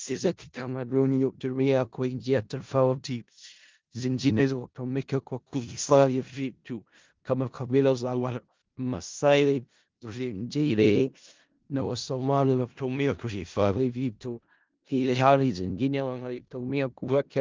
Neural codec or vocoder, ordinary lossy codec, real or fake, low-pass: codec, 16 kHz in and 24 kHz out, 0.4 kbps, LongCat-Audio-Codec, four codebook decoder; Opus, 32 kbps; fake; 7.2 kHz